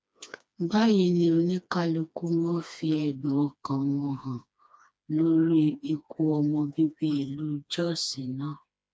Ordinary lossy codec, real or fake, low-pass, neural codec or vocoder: none; fake; none; codec, 16 kHz, 2 kbps, FreqCodec, smaller model